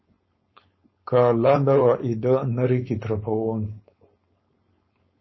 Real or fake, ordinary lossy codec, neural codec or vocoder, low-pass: fake; MP3, 24 kbps; codec, 16 kHz, 4.8 kbps, FACodec; 7.2 kHz